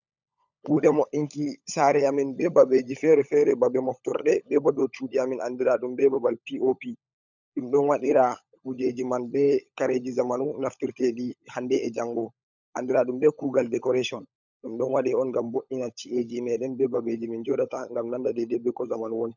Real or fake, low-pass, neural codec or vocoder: fake; 7.2 kHz; codec, 16 kHz, 16 kbps, FunCodec, trained on LibriTTS, 50 frames a second